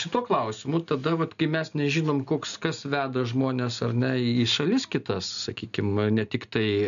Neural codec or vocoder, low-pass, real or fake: none; 7.2 kHz; real